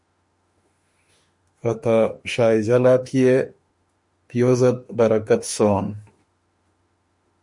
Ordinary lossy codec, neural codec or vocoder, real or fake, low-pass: MP3, 48 kbps; autoencoder, 48 kHz, 32 numbers a frame, DAC-VAE, trained on Japanese speech; fake; 10.8 kHz